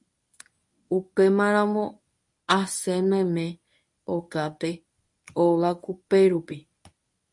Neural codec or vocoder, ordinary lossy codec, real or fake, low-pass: codec, 24 kHz, 0.9 kbps, WavTokenizer, medium speech release version 1; MP3, 48 kbps; fake; 10.8 kHz